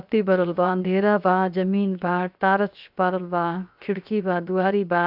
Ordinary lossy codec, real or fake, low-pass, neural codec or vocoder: none; fake; 5.4 kHz; codec, 16 kHz, 0.7 kbps, FocalCodec